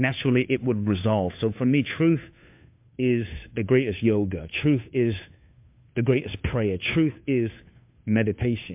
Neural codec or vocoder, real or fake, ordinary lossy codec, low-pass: codec, 16 kHz, 2 kbps, FunCodec, trained on Chinese and English, 25 frames a second; fake; MP3, 32 kbps; 3.6 kHz